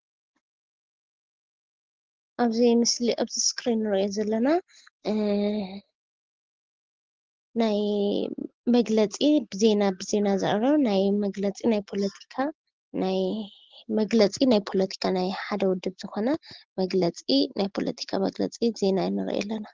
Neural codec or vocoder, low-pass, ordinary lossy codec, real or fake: none; 7.2 kHz; Opus, 16 kbps; real